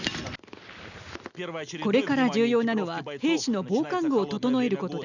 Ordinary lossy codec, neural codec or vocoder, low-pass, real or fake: none; none; 7.2 kHz; real